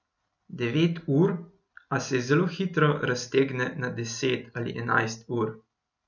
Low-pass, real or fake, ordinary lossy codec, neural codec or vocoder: 7.2 kHz; real; none; none